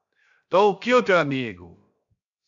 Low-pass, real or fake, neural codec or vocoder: 7.2 kHz; fake; codec, 16 kHz, 0.7 kbps, FocalCodec